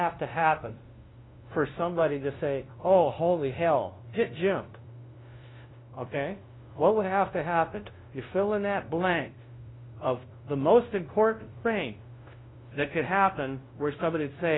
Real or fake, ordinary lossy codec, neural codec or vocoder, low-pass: fake; AAC, 16 kbps; codec, 16 kHz, 0.5 kbps, FunCodec, trained on LibriTTS, 25 frames a second; 7.2 kHz